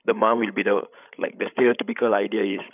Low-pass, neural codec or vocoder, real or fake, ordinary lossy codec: 3.6 kHz; codec, 16 kHz, 8 kbps, FreqCodec, larger model; fake; none